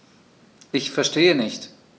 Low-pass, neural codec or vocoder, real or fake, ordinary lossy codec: none; none; real; none